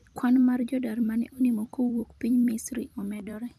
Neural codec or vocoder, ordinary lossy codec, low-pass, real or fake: vocoder, 44.1 kHz, 128 mel bands every 256 samples, BigVGAN v2; MP3, 96 kbps; 14.4 kHz; fake